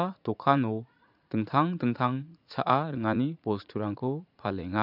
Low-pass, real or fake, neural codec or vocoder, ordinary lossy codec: 5.4 kHz; fake; vocoder, 44.1 kHz, 80 mel bands, Vocos; AAC, 48 kbps